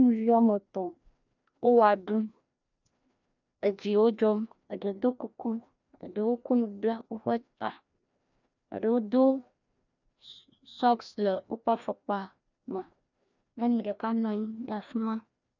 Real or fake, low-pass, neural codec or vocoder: fake; 7.2 kHz; codec, 16 kHz, 1 kbps, FreqCodec, larger model